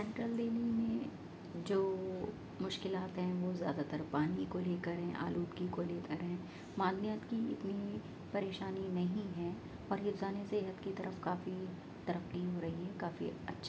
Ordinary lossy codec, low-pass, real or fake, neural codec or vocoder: none; none; real; none